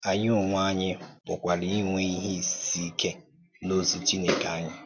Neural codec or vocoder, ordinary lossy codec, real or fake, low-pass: none; none; real; 7.2 kHz